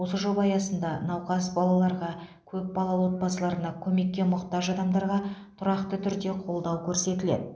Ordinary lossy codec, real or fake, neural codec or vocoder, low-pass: none; real; none; none